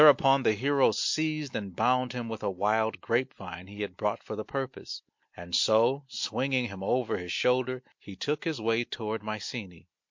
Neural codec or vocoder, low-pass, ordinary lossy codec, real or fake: none; 7.2 kHz; MP3, 48 kbps; real